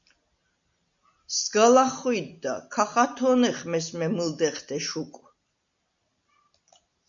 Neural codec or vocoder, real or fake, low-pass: none; real; 7.2 kHz